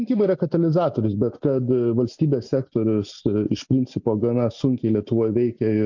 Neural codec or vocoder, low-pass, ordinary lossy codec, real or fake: none; 7.2 kHz; MP3, 48 kbps; real